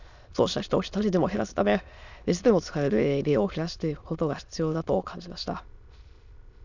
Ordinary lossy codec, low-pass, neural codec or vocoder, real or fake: none; 7.2 kHz; autoencoder, 22.05 kHz, a latent of 192 numbers a frame, VITS, trained on many speakers; fake